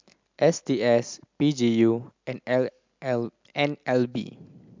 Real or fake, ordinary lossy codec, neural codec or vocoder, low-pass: real; MP3, 64 kbps; none; 7.2 kHz